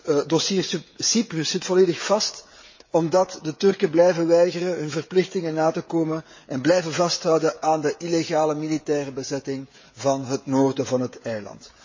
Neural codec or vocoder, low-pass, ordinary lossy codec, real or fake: codec, 16 kHz, 16 kbps, FreqCodec, smaller model; 7.2 kHz; MP3, 32 kbps; fake